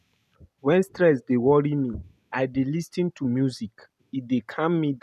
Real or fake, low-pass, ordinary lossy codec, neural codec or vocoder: real; 14.4 kHz; none; none